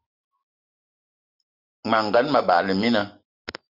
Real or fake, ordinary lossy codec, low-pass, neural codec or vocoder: real; Opus, 64 kbps; 5.4 kHz; none